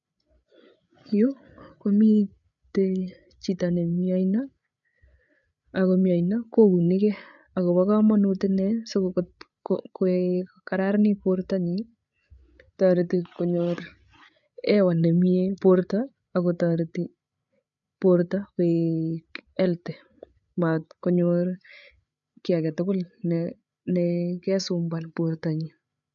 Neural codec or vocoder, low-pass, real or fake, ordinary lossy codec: codec, 16 kHz, 8 kbps, FreqCodec, larger model; 7.2 kHz; fake; none